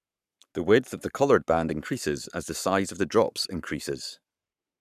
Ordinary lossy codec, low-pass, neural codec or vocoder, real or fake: none; 14.4 kHz; codec, 44.1 kHz, 7.8 kbps, Pupu-Codec; fake